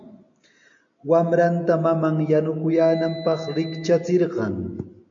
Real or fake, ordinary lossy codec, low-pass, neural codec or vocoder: real; MP3, 64 kbps; 7.2 kHz; none